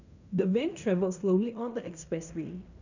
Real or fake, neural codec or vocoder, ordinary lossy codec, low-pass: fake; codec, 16 kHz in and 24 kHz out, 0.9 kbps, LongCat-Audio-Codec, fine tuned four codebook decoder; none; 7.2 kHz